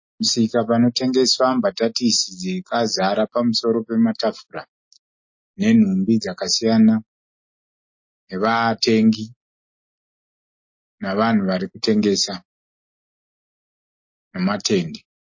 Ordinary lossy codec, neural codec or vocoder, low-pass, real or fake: MP3, 32 kbps; none; 7.2 kHz; real